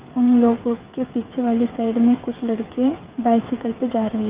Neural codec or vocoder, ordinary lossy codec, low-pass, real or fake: codec, 16 kHz, 8 kbps, FreqCodec, smaller model; Opus, 32 kbps; 3.6 kHz; fake